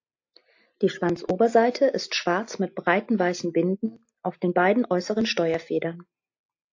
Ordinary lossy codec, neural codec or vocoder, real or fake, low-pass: AAC, 48 kbps; none; real; 7.2 kHz